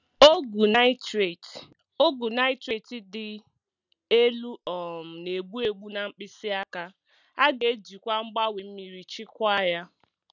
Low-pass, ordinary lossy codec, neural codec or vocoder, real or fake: 7.2 kHz; none; none; real